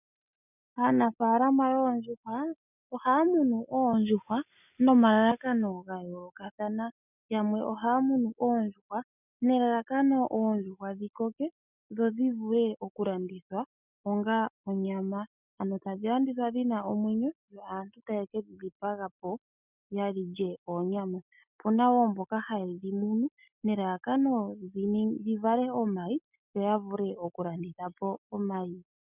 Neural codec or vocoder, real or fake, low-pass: none; real; 3.6 kHz